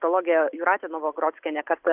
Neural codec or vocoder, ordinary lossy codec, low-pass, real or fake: none; Opus, 32 kbps; 3.6 kHz; real